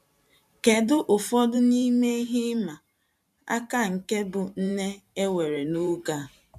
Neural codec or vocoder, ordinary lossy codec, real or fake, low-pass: vocoder, 44.1 kHz, 128 mel bands every 512 samples, BigVGAN v2; none; fake; 14.4 kHz